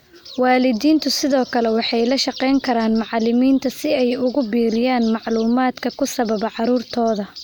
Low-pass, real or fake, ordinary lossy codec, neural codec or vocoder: none; real; none; none